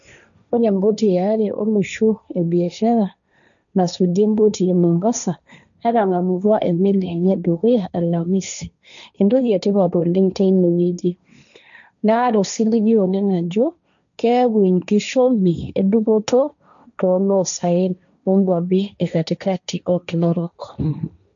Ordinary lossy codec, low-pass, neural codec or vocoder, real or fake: none; 7.2 kHz; codec, 16 kHz, 1.1 kbps, Voila-Tokenizer; fake